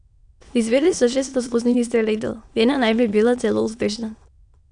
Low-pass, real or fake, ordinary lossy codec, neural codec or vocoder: 9.9 kHz; fake; none; autoencoder, 22.05 kHz, a latent of 192 numbers a frame, VITS, trained on many speakers